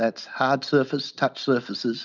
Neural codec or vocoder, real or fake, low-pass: none; real; 7.2 kHz